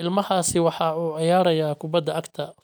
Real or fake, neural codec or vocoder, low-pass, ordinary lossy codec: real; none; none; none